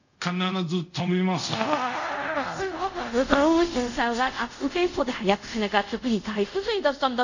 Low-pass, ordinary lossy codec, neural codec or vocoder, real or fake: 7.2 kHz; none; codec, 24 kHz, 0.5 kbps, DualCodec; fake